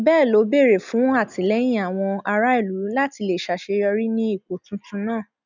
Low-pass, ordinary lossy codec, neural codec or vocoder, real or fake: 7.2 kHz; none; none; real